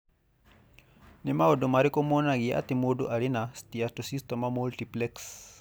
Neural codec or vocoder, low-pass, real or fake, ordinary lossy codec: none; none; real; none